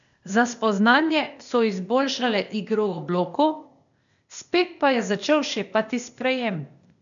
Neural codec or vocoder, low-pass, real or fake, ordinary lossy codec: codec, 16 kHz, 0.8 kbps, ZipCodec; 7.2 kHz; fake; none